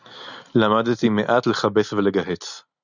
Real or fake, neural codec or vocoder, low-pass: real; none; 7.2 kHz